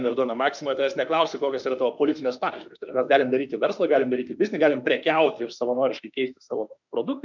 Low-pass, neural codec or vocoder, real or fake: 7.2 kHz; autoencoder, 48 kHz, 32 numbers a frame, DAC-VAE, trained on Japanese speech; fake